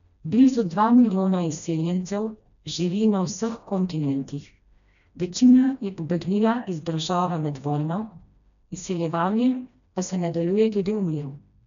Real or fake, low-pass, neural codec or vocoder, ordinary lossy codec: fake; 7.2 kHz; codec, 16 kHz, 1 kbps, FreqCodec, smaller model; none